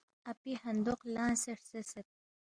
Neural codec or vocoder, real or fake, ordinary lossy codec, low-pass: none; real; Opus, 64 kbps; 9.9 kHz